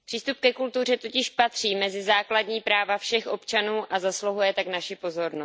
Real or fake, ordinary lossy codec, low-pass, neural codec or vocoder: real; none; none; none